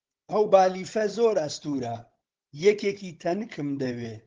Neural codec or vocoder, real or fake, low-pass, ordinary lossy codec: codec, 16 kHz, 16 kbps, FunCodec, trained on Chinese and English, 50 frames a second; fake; 7.2 kHz; Opus, 16 kbps